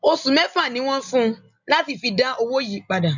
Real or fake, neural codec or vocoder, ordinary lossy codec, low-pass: real; none; none; 7.2 kHz